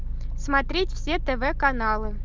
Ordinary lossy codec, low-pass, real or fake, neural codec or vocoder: Opus, 32 kbps; 7.2 kHz; real; none